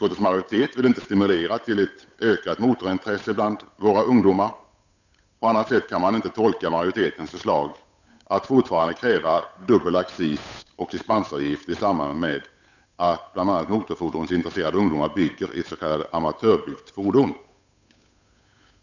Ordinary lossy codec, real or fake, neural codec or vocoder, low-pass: none; fake; codec, 16 kHz, 8 kbps, FunCodec, trained on Chinese and English, 25 frames a second; 7.2 kHz